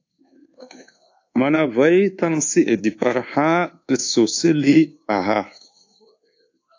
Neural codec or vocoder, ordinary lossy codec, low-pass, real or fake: codec, 24 kHz, 1.2 kbps, DualCodec; AAC, 48 kbps; 7.2 kHz; fake